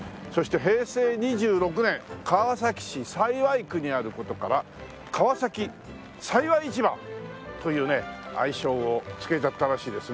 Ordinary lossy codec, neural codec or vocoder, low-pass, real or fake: none; none; none; real